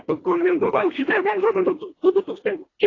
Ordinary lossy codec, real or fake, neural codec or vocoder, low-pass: AAC, 32 kbps; fake; codec, 24 kHz, 1.5 kbps, HILCodec; 7.2 kHz